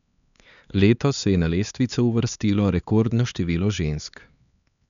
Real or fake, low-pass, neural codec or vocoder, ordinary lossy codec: fake; 7.2 kHz; codec, 16 kHz, 4 kbps, X-Codec, HuBERT features, trained on LibriSpeech; none